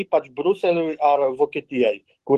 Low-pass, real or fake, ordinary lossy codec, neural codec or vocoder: 14.4 kHz; fake; Opus, 16 kbps; autoencoder, 48 kHz, 128 numbers a frame, DAC-VAE, trained on Japanese speech